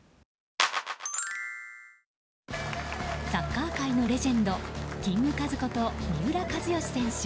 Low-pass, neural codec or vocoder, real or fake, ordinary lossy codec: none; none; real; none